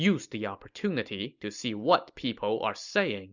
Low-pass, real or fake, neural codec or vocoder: 7.2 kHz; real; none